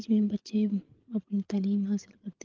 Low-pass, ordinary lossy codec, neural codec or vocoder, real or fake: 7.2 kHz; Opus, 16 kbps; codec, 16 kHz, 8 kbps, FreqCodec, larger model; fake